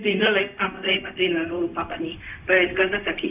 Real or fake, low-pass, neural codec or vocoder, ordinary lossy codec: fake; 3.6 kHz; codec, 16 kHz, 0.4 kbps, LongCat-Audio-Codec; none